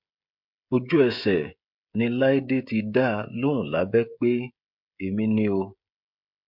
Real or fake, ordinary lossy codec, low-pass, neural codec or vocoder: fake; MP3, 48 kbps; 5.4 kHz; codec, 16 kHz, 16 kbps, FreqCodec, smaller model